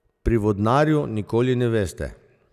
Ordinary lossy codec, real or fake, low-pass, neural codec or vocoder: none; real; 14.4 kHz; none